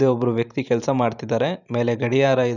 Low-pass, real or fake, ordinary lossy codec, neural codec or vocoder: 7.2 kHz; fake; none; vocoder, 44.1 kHz, 128 mel bands every 512 samples, BigVGAN v2